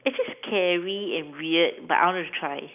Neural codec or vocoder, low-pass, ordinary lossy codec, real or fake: none; 3.6 kHz; none; real